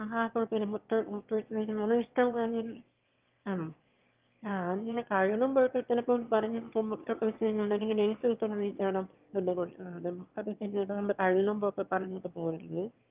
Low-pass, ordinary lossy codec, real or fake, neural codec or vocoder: 3.6 kHz; Opus, 24 kbps; fake; autoencoder, 22.05 kHz, a latent of 192 numbers a frame, VITS, trained on one speaker